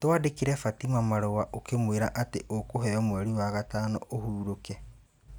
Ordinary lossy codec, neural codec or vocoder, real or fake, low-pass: none; none; real; none